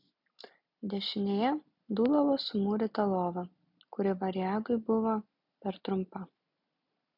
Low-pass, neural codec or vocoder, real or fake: 5.4 kHz; none; real